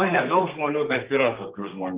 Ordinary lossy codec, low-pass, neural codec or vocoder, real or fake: Opus, 32 kbps; 3.6 kHz; codec, 32 kHz, 1.9 kbps, SNAC; fake